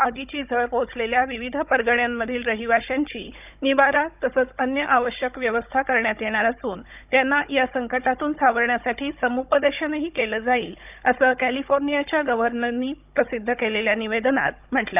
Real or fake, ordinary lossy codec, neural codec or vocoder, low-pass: fake; none; codec, 16 kHz, 16 kbps, FunCodec, trained on Chinese and English, 50 frames a second; 3.6 kHz